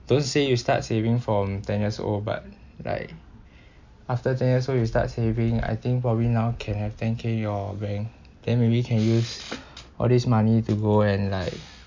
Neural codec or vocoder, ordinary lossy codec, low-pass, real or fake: none; MP3, 64 kbps; 7.2 kHz; real